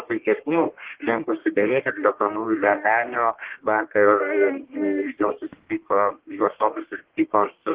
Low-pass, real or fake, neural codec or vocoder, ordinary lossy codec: 3.6 kHz; fake; codec, 44.1 kHz, 1.7 kbps, Pupu-Codec; Opus, 16 kbps